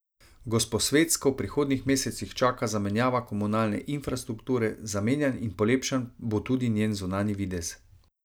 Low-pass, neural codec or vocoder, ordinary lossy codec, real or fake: none; none; none; real